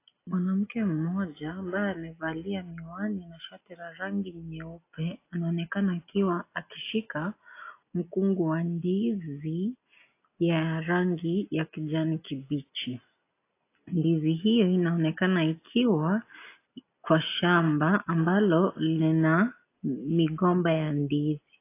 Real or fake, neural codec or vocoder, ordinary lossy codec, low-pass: real; none; AAC, 24 kbps; 3.6 kHz